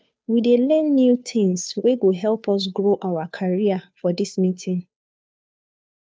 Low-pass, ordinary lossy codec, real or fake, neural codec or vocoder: none; none; fake; codec, 16 kHz, 2 kbps, FunCodec, trained on Chinese and English, 25 frames a second